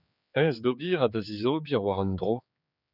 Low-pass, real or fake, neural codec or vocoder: 5.4 kHz; fake; codec, 16 kHz, 4 kbps, X-Codec, HuBERT features, trained on general audio